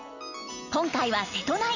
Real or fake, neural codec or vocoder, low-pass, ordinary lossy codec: real; none; 7.2 kHz; none